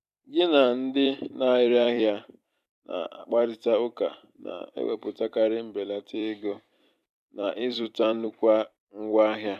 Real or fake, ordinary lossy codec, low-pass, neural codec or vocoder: fake; AAC, 96 kbps; 14.4 kHz; vocoder, 44.1 kHz, 128 mel bands every 256 samples, BigVGAN v2